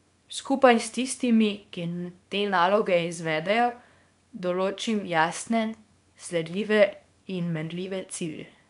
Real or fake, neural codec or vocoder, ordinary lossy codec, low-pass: fake; codec, 24 kHz, 0.9 kbps, WavTokenizer, small release; none; 10.8 kHz